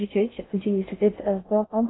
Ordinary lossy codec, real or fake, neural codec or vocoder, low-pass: AAC, 16 kbps; fake; codec, 16 kHz in and 24 kHz out, 0.6 kbps, FocalCodec, streaming, 4096 codes; 7.2 kHz